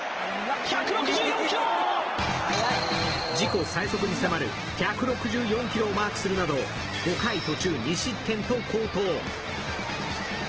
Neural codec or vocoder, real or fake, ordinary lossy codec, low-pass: none; real; Opus, 16 kbps; 7.2 kHz